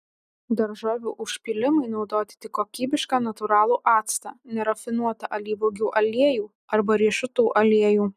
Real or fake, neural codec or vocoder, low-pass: real; none; 14.4 kHz